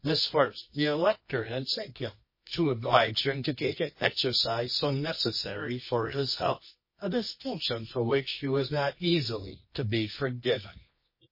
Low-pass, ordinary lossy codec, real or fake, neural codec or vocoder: 5.4 kHz; MP3, 24 kbps; fake; codec, 24 kHz, 0.9 kbps, WavTokenizer, medium music audio release